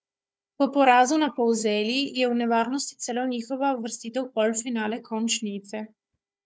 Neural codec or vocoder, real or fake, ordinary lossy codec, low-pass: codec, 16 kHz, 4 kbps, FunCodec, trained on Chinese and English, 50 frames a second; fake; none; none